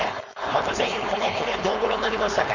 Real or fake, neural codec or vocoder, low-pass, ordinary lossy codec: fake; codec, 16 kHz, 4.8 kbps, FACodec; 7.2 kHz; none